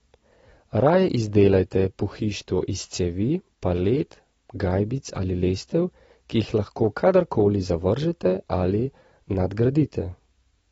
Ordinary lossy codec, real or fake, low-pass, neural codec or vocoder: AAC, 24 kbps; real; 10.8 kHz; none